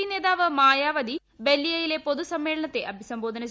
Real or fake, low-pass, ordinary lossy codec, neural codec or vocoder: real; none; none; none